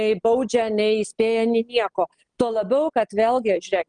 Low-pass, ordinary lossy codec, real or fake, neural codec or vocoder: 9.9 kHz; Opus, 32 kbps; real; none